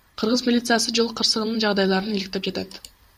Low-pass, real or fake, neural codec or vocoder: 14.4 kHz; fake; vocoder, 44.1 kHz, 128 mel bands every 512 samples, BigVGAN v2